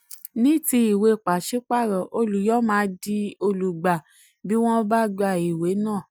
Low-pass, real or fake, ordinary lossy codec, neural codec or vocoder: none; real; none; none